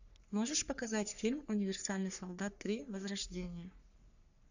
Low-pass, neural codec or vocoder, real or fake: 7.2 kHz; codec, 44.1 kHz, 3.4 kbps, Pupu-Codec; fake